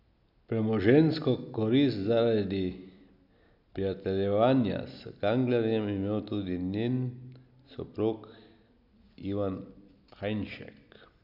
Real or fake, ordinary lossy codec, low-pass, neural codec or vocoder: real; none; 5.4 kHz; none